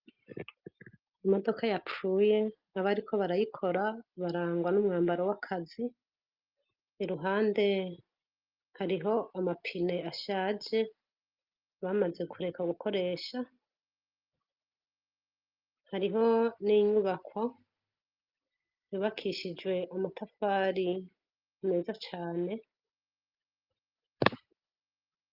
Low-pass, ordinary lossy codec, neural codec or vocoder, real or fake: 5.4 kHz; Opus, 24 kbps; none; real